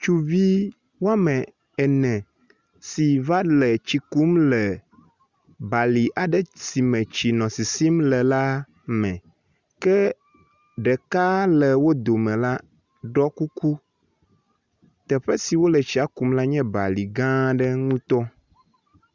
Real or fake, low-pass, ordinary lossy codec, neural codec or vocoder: real; 7.2 kHz; Opus, 64 kbps; none